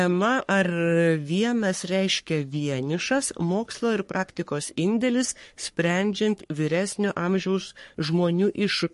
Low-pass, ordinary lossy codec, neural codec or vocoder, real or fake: 14.4 kHz; MP3, 48 kbps; codec, 44.1 kHz, 3.4 kbps, Pupu-Codec; fake